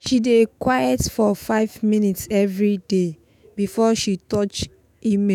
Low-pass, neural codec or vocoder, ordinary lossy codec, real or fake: 19.8 kHz; autoencoder, 48 kHz, 128 numbers a frame, DAC-VAE, trained on Japanese speech; none; fake